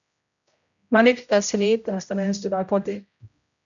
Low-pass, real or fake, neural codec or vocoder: 7.2 kHz; fake; codec, 16 kHz, 0.5 kbps, X-Codec, HuBERT features, trained on general audio